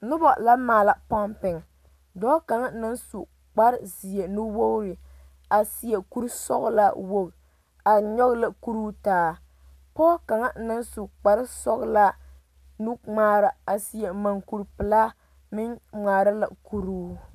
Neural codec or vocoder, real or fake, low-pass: none; real; 14.4 kHz